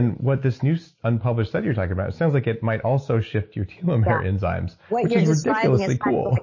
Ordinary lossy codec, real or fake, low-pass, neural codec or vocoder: MP3, 32 kbps; real; 7.2 kHz; none